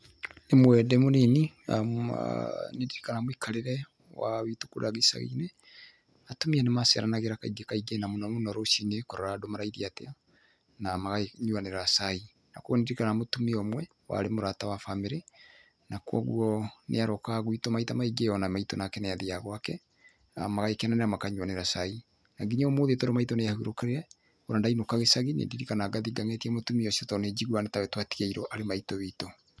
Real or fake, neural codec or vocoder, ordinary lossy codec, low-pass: real; none; none; none